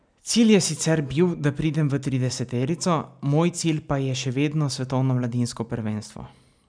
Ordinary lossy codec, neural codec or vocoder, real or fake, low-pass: none; none; real; 9.9 kHz